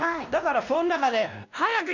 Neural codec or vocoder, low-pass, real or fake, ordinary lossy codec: codec, 16 kHz, 1 kbps, X-Codec, WavLM features, trained on Multilingual LibriSpeech; 7.2 kHz; fake; none